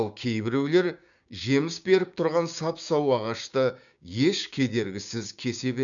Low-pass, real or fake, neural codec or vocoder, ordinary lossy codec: 7.2 kHz; fake; codec, 16 kHz, 6 kbps, DAC; none